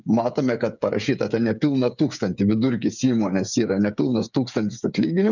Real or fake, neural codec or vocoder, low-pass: fake; codec, 16 kHz, 8 kbps, FreqCodec, smaller model; 7.2 kHz